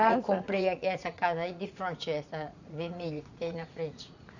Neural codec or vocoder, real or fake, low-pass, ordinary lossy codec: vocoder, 44.1 kHz, 80 mel bands, Vocos; fake; 7.2 kHz; none